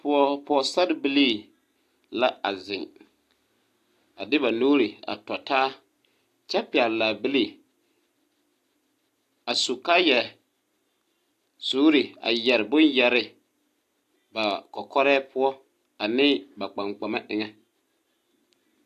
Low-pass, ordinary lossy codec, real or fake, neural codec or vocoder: 14.4 kHz; AAC, 64 kbps; real; none